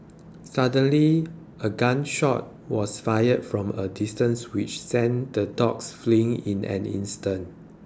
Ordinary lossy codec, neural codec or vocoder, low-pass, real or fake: none; none; none; real